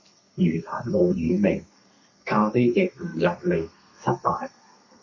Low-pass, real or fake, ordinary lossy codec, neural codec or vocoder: 7.2 kHz; fake; MP3, 32 kbps; codec, 32 kHz, 1.9 kbps, SNAC